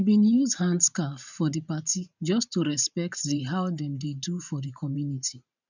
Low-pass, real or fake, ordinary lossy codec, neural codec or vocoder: 7.2 kHz; fake; none; vocoder, 44.1 kHz, 128 mel bands every 512 samples, BigVGAN v2